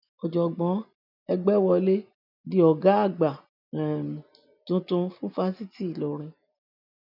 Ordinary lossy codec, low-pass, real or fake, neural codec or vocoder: none; 5.4 kHz; real; none